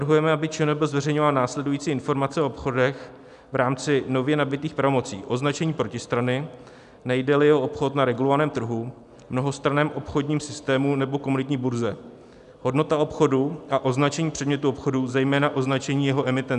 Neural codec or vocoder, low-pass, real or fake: none; 10.8 kHz; real